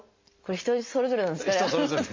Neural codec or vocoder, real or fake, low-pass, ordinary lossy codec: none; real; 7.2 kHz; MP3, 48 kbps